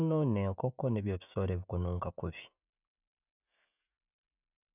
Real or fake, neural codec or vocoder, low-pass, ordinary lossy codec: real; none; 3.6 kHz; none